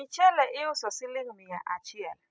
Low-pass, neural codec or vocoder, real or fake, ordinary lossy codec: none; none; real; none